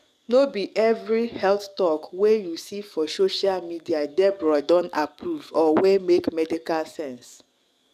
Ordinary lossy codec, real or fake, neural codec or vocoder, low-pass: none; fake; codec, 44.1 kHz, 7.8 kbps, DAC; 14.4 kHz